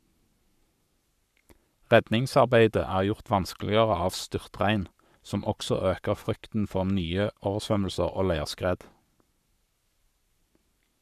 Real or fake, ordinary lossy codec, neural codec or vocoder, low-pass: fake; none; codec, 44.1 kHz, 7.8 kbps, Pupu-Codec; 14.4 kHz